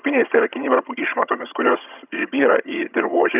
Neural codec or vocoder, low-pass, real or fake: vocoder, 22.05 kHz, 80 mel bands, HiFi-GAN; 3.6 kHz; fake